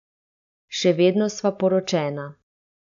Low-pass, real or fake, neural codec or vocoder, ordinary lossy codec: 7.2 kHz; real; none; none